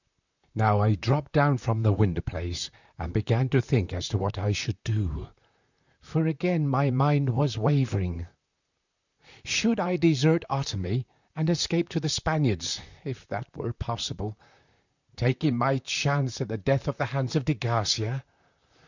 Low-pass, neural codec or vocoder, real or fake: 7.2 kHz; vocoder, 44.1 kHz, 128 mel bands, Pupu-Vocoder; fake